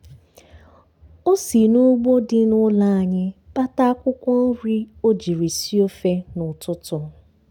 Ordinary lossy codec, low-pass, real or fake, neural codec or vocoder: none; 19.8 kHz; real; none